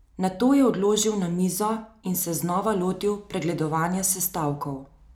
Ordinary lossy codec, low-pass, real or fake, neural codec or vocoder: none; none; real; none